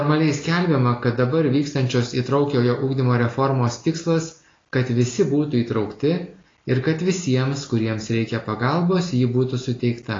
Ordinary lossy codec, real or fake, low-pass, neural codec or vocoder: AAC, 32 kbps; real; 7.2 kHz; none